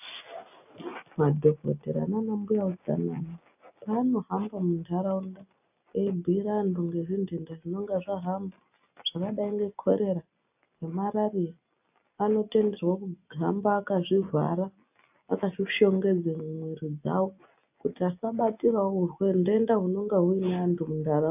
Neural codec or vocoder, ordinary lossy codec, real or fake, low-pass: none; AAC, 32 kbps; real; 3.6 kHz